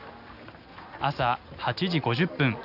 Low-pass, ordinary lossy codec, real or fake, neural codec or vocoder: 5.4 kHz; none; real; none